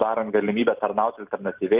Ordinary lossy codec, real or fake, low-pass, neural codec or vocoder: Opus, 32 kbps; real; 3.6 kHz; none